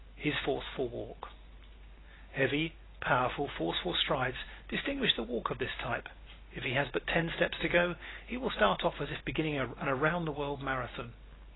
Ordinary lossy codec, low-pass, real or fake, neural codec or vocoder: AAC, 16 kbps; 7.2 kHz; real; none